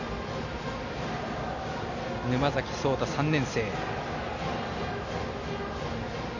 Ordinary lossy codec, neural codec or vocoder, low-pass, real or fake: none; none; 7.2 kHz; real